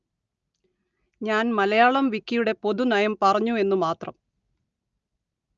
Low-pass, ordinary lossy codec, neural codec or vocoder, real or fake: 7.2 kHz; Opus, 24 kbps; none; real